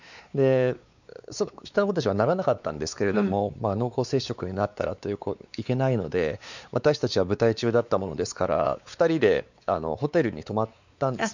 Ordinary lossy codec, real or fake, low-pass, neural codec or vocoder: none; fake; 7.2 kHz; codec, 16 kHz, 4 kbps, X-Codec, WavLM features, trained on Multilingual LibriSpeech